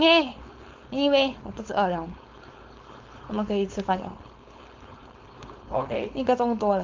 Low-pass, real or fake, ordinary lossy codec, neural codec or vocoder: 7.2 kHz; fake; Opus, 32 kbps; codec, 16 kHz, 4.8 kbps, FACodec